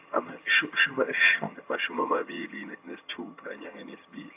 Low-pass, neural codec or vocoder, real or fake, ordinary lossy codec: 3.6 kHz; vocoder, 22.05 kHz, 80 mel bands, HiFi-GAN; fake; AAC, 32 kbps